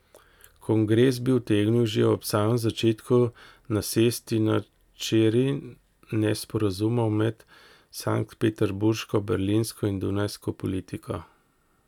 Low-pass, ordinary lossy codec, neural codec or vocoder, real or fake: 19.8 kHz; none; none; real